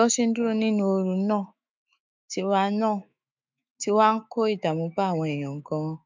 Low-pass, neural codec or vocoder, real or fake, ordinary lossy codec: 7.2 kHz; autoencoder, 48 kHz, 128 numbers a frame, DAC-VAE, trained on Japanese speech; fake; MP3, 64 kbps